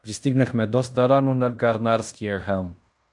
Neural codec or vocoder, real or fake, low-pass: codec, 16 kHz in and 24 kHz out, 0.9 kbps, LongCat-Audio-Codec, fine tuned four codebook decoder; fake; 10.8 kHz